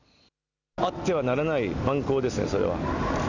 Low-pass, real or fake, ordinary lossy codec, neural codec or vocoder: 7.2 kHz; real; none; none